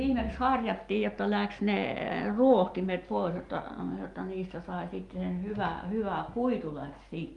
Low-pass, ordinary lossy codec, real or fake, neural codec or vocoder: 10.8 kHz; Opus, 32 kbps; real; none